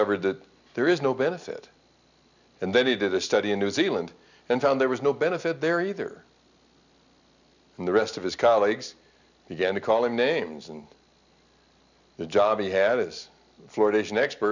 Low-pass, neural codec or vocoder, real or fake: 7.2 kHz; none; real